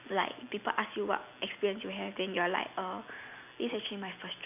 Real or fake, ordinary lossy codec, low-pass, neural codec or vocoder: real; none; 3.6 kHz; none